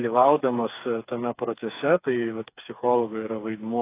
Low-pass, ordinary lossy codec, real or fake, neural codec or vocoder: 3.6 kHz; AAC, 24 kbps; fake; codec, 16 kHz, 4 kbps, FreqCodec, smaller model